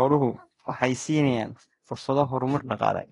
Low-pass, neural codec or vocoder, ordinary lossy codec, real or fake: 10.8 kHz; codec, 24 kHz, 0.9 kbps, DualCodec; AAC, 32 kbps; fake